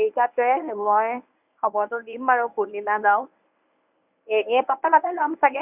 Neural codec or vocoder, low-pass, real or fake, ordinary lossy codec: codec, 24 kHz, 0.9 kbps, WavTokenizer, medium speech release version 1; 3.6 kHz; fake; none